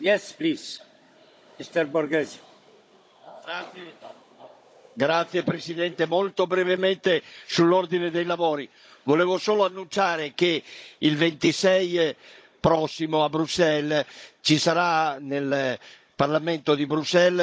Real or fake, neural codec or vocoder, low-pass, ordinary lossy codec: fake; codec, 16 kHz, 16 kbps, FunCodec, trained on Chinese and English, 50 frames a second; none; none